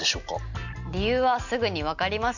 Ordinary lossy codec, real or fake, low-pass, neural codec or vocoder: none; real; 7.2 kHz; none